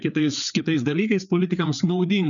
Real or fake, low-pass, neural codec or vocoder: fake; 7.2 kHz; codec, 16 kHz, 4 kbps, FreqCodec, larger model